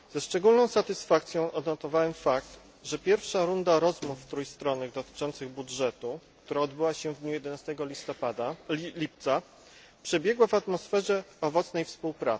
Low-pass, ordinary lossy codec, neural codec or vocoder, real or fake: none; none; none; real